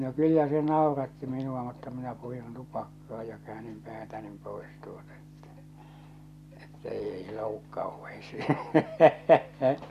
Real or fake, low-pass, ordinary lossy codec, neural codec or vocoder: real; 14.4 kHz; none; none